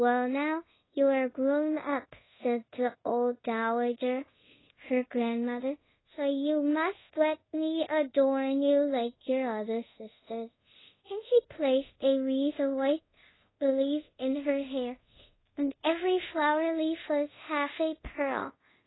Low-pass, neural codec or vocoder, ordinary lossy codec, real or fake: 7.2 kHz; codec, 24 kHz, 0.5 kbps, DualCodec; AAC, 16 kbps; fake